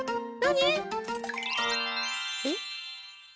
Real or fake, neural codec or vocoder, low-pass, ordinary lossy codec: real; none; none; none